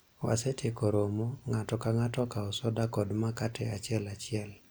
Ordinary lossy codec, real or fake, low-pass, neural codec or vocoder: none; real; none; none